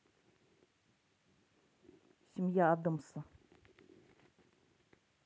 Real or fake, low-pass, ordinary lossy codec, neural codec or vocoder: real; none; none; none